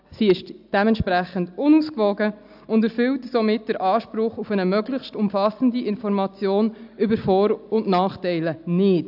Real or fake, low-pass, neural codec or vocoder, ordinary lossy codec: real; 5.4 kHz; none; AAC, 48 kbps